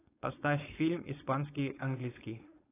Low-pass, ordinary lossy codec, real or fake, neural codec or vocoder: 3.6 kHz; AAC, 16 kbps; fake; codec, 16 kHz, 4.8 kbps, FACodec